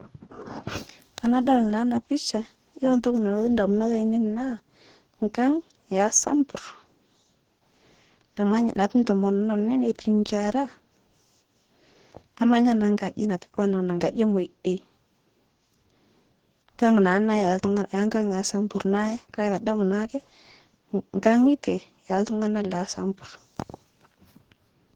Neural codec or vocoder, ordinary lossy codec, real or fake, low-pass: codec, 44.1 kHz, 2.6 kbps, DAC; Opus, 16 kbps; fake; 19.8 kHz